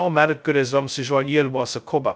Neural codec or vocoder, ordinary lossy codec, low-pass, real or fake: codec, 16 kHz, 0.2 kbps, FocalCodec; none; none; fake